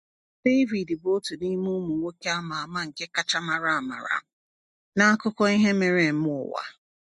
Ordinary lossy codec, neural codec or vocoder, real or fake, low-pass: MP3, 48 kbps; none; real; 14.4 kHz